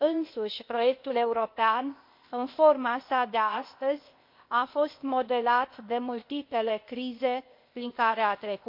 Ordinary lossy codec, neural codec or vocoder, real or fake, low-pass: MP3, 32 kbps; codec, 16 kHz, 0.8 kbps, ZipCodec; fake; 5.4 kHz